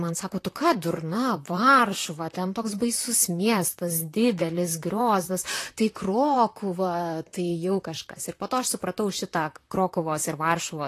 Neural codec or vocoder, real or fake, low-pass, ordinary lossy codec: vocoder, 44.1 kHz, 128 mel bands, Pupu-Vocoder; fake; 14.4 kHz; AAC, 48 kbps